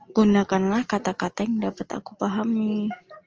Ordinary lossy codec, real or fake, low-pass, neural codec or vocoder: Opus, 24 kbps; real; 7.2 kHz; none